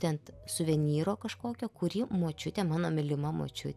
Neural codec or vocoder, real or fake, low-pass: none; real; 14.4 kHz